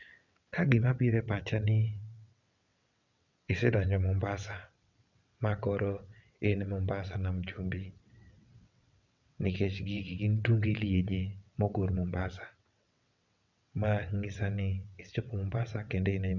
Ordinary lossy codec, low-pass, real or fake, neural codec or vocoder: none; 7.2 kHz; fake; vocoder, 22.05 kHz, 80 mel bands, WaveNeXt